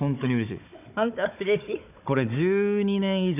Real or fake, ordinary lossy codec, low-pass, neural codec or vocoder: fake; none; 3.6 kHz; codec, 16 kHz, 16 kbps, FunCodec, trained on Chinese and English, 50 frames a second